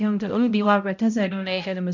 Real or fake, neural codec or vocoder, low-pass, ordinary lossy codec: fake; codec, 16 kHz, 0.5 kbps, X-Codec, HuBERT features, trained on balanced general audio; 7.2 kHz; none